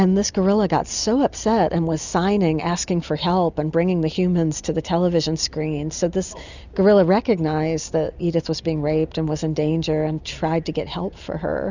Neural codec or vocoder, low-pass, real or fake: none; 7.2 kHz; real